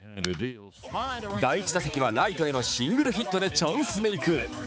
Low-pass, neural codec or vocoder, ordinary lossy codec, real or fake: none; codec, 16 kHz, 4 kbps, X-Codec, HuBERT features, trained on balanced general audio; none; fake